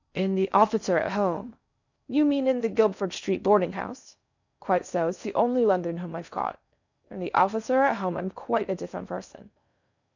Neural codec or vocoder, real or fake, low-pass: codec, 16 kHz in and 24 kHz out, 0.6 kbps, FocalCodec, streaming, 4096 codes; fake; 7.2 kHz